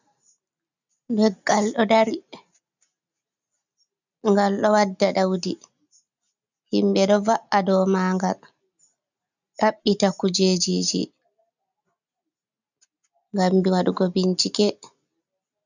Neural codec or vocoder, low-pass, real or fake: none; 7.2 kHz; real